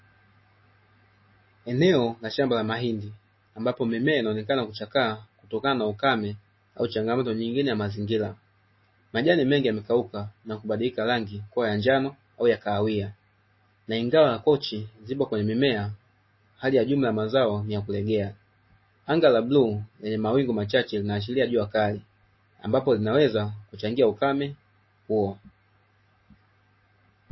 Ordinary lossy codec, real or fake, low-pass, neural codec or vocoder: MP3, 24 kbps; real; 7.2 kHz; none